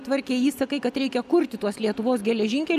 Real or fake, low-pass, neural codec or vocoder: real; 14.4 kHz; none